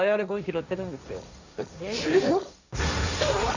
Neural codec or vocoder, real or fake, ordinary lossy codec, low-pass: codec, 16 kHz, 1.1 kbps, Voila-Tokenizer; fake; none; 7.2 kHz